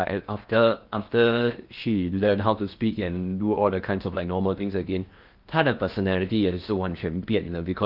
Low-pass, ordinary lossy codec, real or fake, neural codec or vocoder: 5.4 kHz; Opus, 32 kbps; fake; codec, 16 kHz in and 24 kHz out, 0.8 kbps, FocalCodec, streaming, 65536 codes